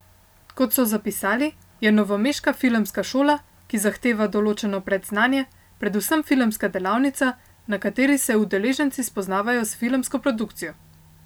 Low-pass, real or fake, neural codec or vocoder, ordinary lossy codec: none; real; none; none